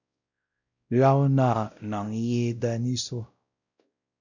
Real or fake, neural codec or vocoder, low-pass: fake; codec, 16 kHz, 0.5 kbps, X-Codec, WavLM features, trained on Multilingual LibriSpeech; 7.2 kHz